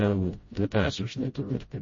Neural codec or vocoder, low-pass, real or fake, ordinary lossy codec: codec, 16 kHz, 0.5 kbps, FreqCodec, smaller model; 7.2 kHz; fake; MP3, 32 kbps